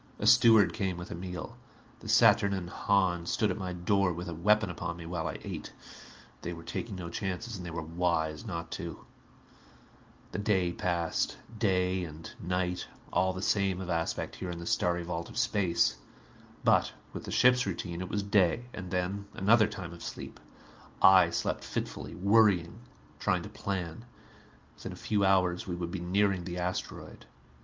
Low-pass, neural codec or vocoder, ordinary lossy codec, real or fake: 7.2 kHz; none; Opus, 24 kbps; real